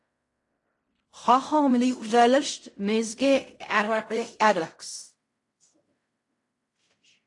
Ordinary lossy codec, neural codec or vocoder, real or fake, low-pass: AAC, 48 kbps; codec, 16 kHz in and 24 kHz out, 0.4 kbps, LongCat-Audio-Codec, fine tuned four codebook decoder; fake; 10.8 kHz